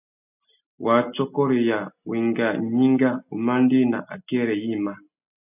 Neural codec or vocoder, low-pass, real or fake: none; 3.6 kHz; real